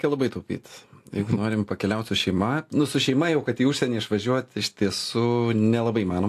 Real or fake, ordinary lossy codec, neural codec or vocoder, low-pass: real; AAC, 64 kbps; none; 14.4 kHz